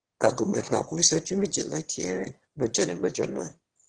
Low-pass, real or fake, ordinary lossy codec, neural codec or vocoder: 9.9 kHz; fake; Opus, 16 kbps; autoencoder, 22.05 kHz, a latent of 192 numbers a frame, VITS, trained on one speaker